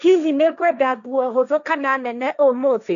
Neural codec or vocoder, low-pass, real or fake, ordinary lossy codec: codec, 16 kHz, 1.1 kbps, Voila-Tokenizer; 7.2 kHz; fake; none